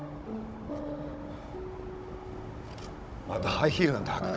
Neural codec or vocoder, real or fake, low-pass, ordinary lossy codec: codec, 16 kHz, 16 kbps, FunCodec, trained on Chinese and English, 50 frames a second; fake; none; none